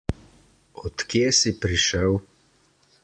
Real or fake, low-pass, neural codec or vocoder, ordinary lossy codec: real; 9.9 kHz; none; AAC, 64 kbps